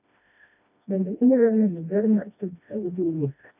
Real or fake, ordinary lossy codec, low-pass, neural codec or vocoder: fake; none; 3.6 kHz; codec, 16 kHz, 1 kbps, FreqCodec, smaller model